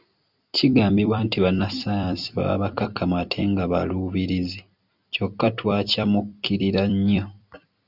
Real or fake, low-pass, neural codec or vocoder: fake; 5.4 kHz; vocoder, 44.1 kHz, 128 mel bands every 256 samples, BigVGAN v2